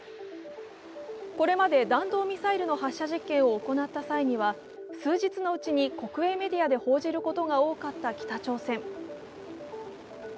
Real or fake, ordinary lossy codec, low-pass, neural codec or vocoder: real; none; none; none